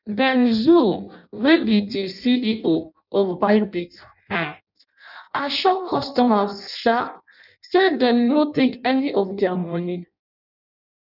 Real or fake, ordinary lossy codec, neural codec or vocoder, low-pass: fake; none; codec, 16 kHz in and 24 kHz out, 0.6 kbps, FireRedTTS-2 codec; 5.4 kHz